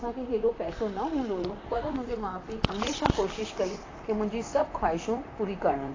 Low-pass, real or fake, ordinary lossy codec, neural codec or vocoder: 7.2 kHz; fake; AAC, 32 kbps; vocoder, 44.1 kHz, 128 mel bands, Pupu-Vocoder